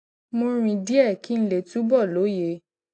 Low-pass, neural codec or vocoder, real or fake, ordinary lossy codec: 9.9 kHz; none; real; AAC, 48 kbps